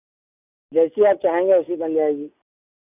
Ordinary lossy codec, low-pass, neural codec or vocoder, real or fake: none; 3.6 kHz; none; real